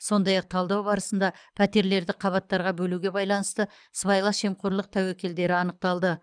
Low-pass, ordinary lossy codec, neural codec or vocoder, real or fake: 9.9 kHz; none; codec, 24 kHz, 6 kbps, HILCodec; fake